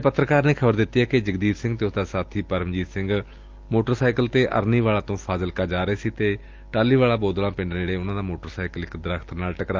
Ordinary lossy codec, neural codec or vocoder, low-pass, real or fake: Opus, 24 kbps; none; 7.2 kHz; real